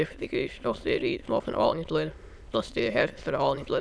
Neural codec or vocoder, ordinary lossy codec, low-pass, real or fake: autoencoder, 22.05 kHz, a latent of 192 numbers a frame, VITS, trained on many speakers; none; none; fake